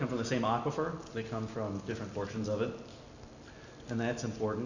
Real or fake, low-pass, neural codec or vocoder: real; 7.2 kHz; none